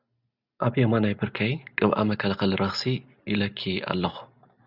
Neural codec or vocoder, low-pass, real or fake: none; 5.4 kHz; real